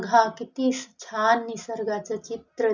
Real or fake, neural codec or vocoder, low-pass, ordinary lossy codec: real; none; 7.2 kHz; none